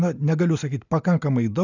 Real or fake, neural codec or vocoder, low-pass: real; none; 7.2 kHz